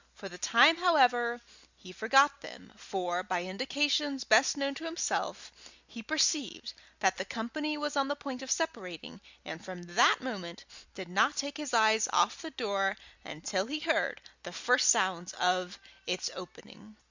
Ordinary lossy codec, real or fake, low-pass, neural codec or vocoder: Opus, 64 kbps; real; 7.2 kHz; none